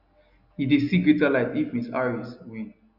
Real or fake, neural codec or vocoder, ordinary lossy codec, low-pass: real; none; none; 5.4 kHz